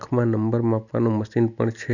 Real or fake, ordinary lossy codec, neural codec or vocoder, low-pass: real; none; none; 7.2 kHz